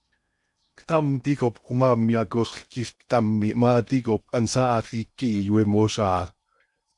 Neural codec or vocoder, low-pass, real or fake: codec, 16 kHz in and 24 kHz out, 0.6 kbps, FocalCodec, streaming, 2048 codes; 10.8 kHz; fake